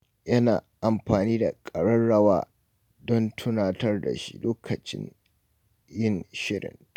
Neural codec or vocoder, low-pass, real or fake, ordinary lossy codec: none; 19.8 kHz; real; none